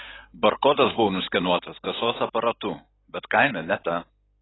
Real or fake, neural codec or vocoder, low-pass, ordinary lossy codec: real; none; 7.2 kHz; AAC, 16 kbps